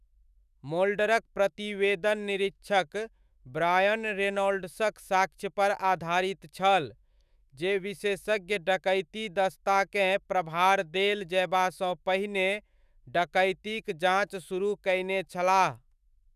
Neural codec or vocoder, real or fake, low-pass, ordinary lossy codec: autoencoder, 48 kHz, 128 numbers a frame, DAC-VAE, trained on Japanese speech; fake; 14.4 kHz; none